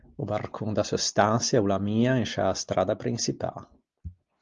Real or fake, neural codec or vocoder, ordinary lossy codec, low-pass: real; none; Opus, 32 kbps; 7.2 kHz